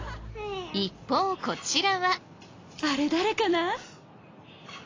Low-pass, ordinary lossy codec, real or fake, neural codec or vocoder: 7.2 kHz; AAC, 32 kbps; real; none